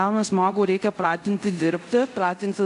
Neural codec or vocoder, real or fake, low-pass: codec, 24 kHz, 0.5 kbps, DualCodec; fake; 10.8 kHz